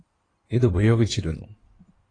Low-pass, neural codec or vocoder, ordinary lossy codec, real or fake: 9.9 kHz; codec, 16 kHz in and 24 kHz out, 2.2 kbps, FireRedTTS-2 codec; AAC, 32 kbps; fake